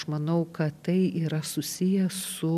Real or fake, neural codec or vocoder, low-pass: real; none; 14.4 kHz